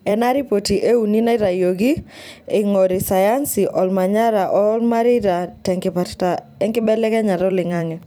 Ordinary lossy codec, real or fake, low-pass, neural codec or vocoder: none; fake; none; vocoder, 44.1 kHz, 128 mel bands every 256 samples, BigVGAN v2